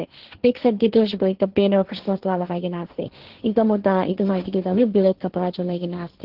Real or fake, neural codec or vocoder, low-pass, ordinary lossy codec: fake; codec, 16 kHz, 1.1 kbps, Voila-Tokenizer; 5.4 kHz; Opus, 16 kbps